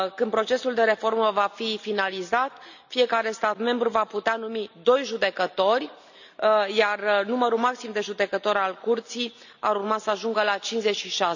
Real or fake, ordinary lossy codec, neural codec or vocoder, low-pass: real; none; none; 7.2 kHz